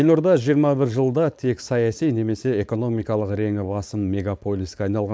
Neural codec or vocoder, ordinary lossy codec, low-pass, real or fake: codec, 16 kHz, 4.8 kbps, FACodec; none; none; fake